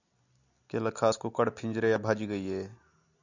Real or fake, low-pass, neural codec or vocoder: real; 7.2 kHz; none